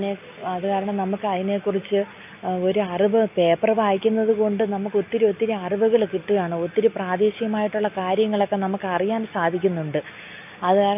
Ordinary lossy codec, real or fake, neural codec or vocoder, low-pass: AAC, 32 kbps; real; none; 3.6 kHz